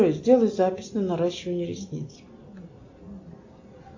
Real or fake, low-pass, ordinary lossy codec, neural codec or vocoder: real; 7.2 kHz; AAC, 48 kbps; none